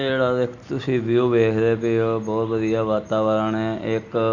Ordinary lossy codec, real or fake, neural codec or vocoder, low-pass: none; real; none; 7.2 kHz